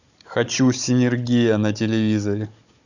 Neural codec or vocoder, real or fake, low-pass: codec, 16 kHz, 16 kbps, FunCodec, trained on Chinese and English, 50 frames a second; fake; 7.2 kHz